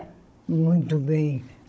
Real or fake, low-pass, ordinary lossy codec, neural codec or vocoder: fake; none; none; codec, 16 kHz, 16 kbps, FunCodec, trained on Chinese and English, 50 frames a second